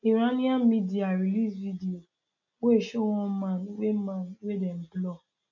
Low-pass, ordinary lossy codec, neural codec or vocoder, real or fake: 7.2 kHz; none; none; real